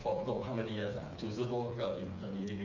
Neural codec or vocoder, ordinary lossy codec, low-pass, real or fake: codec, 16 kHz, 4 kbps, FreqCodec, smaller model; none; 7.2 kHz; fake